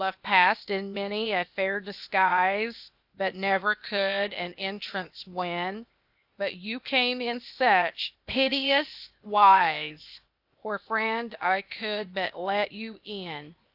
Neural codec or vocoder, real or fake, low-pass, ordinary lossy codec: codec, 16 kHz, 0.8 kbps, ZipCodec; fake; 5.4 kHz; AAC, 48 kbps